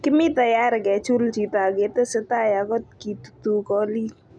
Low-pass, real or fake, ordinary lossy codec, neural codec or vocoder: 9.9 kHz; real; none; none